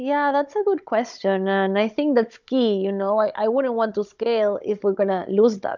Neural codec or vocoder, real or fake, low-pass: codec, 16 kHz, 16 kbps, FunCodec, trained on Chinese and English, 50 frames a second; fake; 7.2 kHz